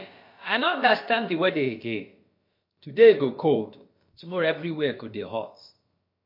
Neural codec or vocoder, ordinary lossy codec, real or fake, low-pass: codec, 16 kHz, about 1 kbps, DyCAST, with the encoder's durations; MP3, 32 kbps; fake; 5.4 kHz